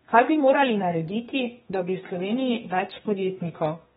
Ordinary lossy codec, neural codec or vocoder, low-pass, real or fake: AAC, 16 kbps; codec, 32 kHz, 1.9 kbps, SNAC; 14.4 kHz; fake